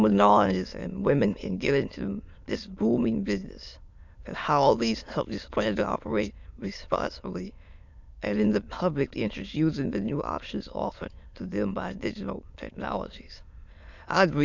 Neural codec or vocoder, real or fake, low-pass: autoencoder, 22.05 kHz, a latent of 192 numbers a frame, VITS, trained on many speakers; fake; 7.2 kHz